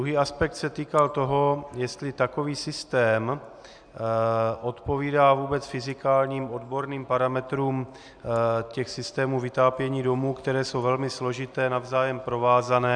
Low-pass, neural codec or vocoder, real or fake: 9.9 kHz; none; real